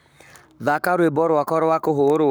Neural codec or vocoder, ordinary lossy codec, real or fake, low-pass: codec, 44.1 kHz, 7.8 kbps, Pupu-Codec; none; fake; none